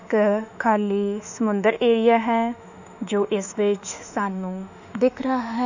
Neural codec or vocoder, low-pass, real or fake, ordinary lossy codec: autoencoder, 48 kHz, 32 numbers a frame, DAC-VAE, trained on Japanese speech; 7.2 kHz; fake; none